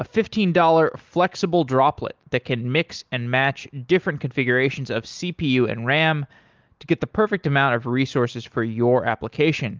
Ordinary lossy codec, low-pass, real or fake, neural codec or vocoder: Opus, 32 kbps; 7.2 kHz; real; none